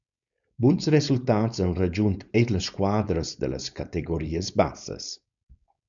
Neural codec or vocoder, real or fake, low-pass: codec, 16 kHz, 4.8 kbps, FACodec; fake; 7.2 kHz